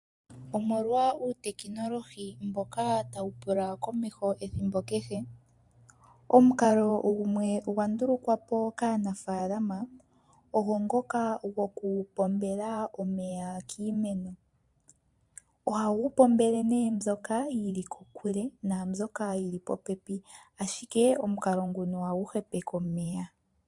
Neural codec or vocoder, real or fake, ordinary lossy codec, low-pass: vocoder, 44.1 kHz, 128 mel bands every 512 samples, BigVGAN v2; fake; MP3, 64 kbps; 10.8 kHz